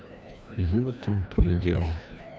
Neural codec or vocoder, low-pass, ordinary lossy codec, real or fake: codec, 16 kHz, 1 kbps, FreqCodec, larger model; none; none; fake